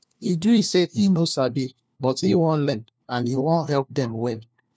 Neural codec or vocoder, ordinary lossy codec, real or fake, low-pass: codec, 16 kHz, 1 kbps, FunCodec, trained on LibriTTS, 50 frames a second; none; fake; none